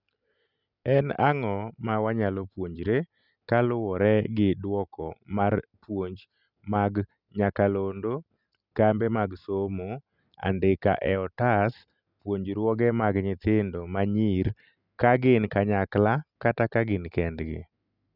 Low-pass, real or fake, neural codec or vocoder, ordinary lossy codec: 5.4 kHz; real; none; none